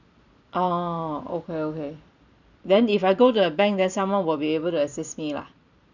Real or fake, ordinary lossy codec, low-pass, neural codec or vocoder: fake; none; 7.2 kHz; vocoder, 44.1 kHz, 128 mel bands every 256 samples, BigVGAN v2